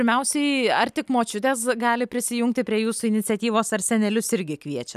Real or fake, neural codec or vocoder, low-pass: real; none; 14.4 kHz